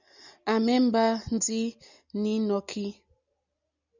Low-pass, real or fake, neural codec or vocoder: 7.2 kHz; real; none